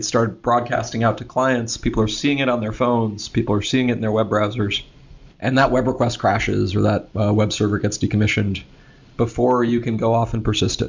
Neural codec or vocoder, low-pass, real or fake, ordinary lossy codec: none; 7.2 kHz; real; MP3, 64 kbps